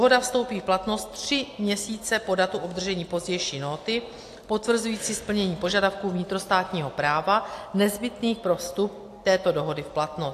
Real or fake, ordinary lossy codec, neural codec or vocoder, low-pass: real; AAC, 64 kbps; none; 14.4 kHz